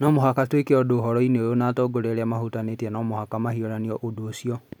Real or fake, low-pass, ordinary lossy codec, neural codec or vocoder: real; none; none; none